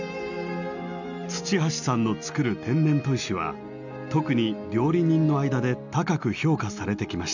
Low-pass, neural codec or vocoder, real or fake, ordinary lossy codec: 7.2 kHz; none; real; none